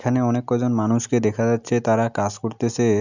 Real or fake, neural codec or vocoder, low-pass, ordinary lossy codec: real; none; 7.2 kHz; none